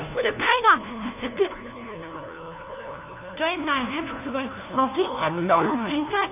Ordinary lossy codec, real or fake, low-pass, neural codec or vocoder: none; fake; 3.6 kHz; codec, 16 kHz, 1 kbps, FunCodec, trained on LibriTTS, 50 frames a second